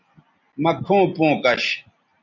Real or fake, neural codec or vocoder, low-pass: real; none; 7.2 kHz